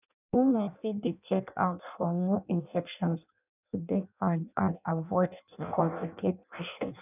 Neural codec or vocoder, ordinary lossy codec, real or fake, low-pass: codec, 16 kHz in and 24 kHz out, 0.6 kbps, FireRedTTS-2 codec; none; fake; 3.6 kHz